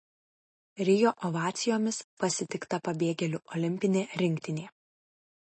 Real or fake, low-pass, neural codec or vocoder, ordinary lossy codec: real; 10.8 kHz; none; MP3, 32 kbps